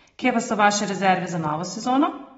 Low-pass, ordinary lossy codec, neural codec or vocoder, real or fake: 19.8 kHz; AAC, 24 kbps; none; real